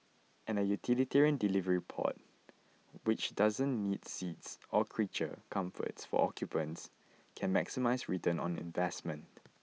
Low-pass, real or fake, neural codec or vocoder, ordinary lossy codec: none; real; none; none